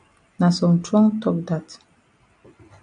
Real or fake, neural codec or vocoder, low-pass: real; none; 9.9 kHz